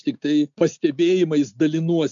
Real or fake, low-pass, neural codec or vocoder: real; 7.2 kHz; none